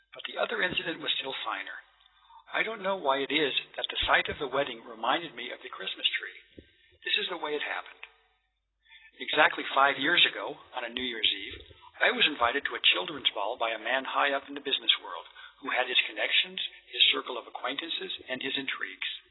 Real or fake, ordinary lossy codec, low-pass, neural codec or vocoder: real; AAC, 16 kbps; 7.2 kHz; none